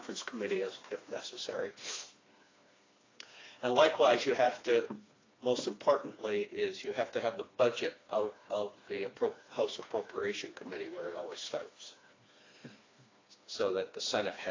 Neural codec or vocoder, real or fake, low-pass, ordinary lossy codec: codec, 16 kHz, 2 kbps, FreqCodec, smaller model; fake; 7.2 kHz; AAC, 32 kbps